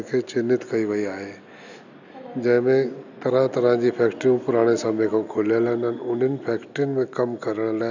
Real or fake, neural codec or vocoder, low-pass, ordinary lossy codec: real; none; 7.2 kHz; none